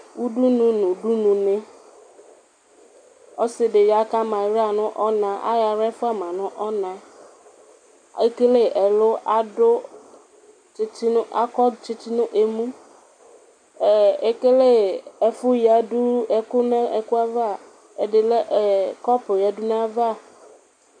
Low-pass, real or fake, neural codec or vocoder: 9.9 kHz; real; none